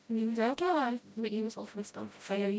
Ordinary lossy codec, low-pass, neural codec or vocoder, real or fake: none; none; codec, 16 kHz, 0.5 kbps, FreqCodec, smaller model; fake